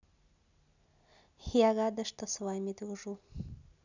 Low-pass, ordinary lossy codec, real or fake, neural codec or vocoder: 7.2 kHz; none; real; none